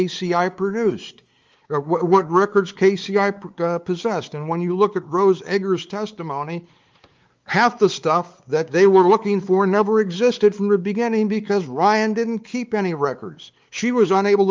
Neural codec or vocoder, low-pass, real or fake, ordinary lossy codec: codec, 16 kHz, 4 kbps, X-Codec, WavLM features, trained on Multilingual LibriSpeech; 7.2 kHz; fake; Opus, 32 kbps